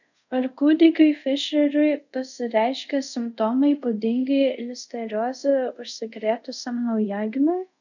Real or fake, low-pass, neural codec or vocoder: fake; 7.2 kHz; codec, 24 kHz, 0.5 kbps, DualCodec